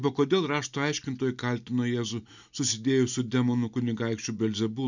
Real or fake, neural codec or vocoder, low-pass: real; none; 7.2 kHz